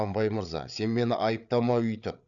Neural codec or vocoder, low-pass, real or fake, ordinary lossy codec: codec, 16 kHz, 8 kbps, FreqCodec, larger model; 7.2 kHz; fake; none